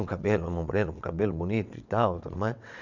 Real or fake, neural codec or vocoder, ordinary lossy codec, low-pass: fake; vocoder, 22.05 kHz, 80 mel bands, WaveNeXt; none; 7.2 kHz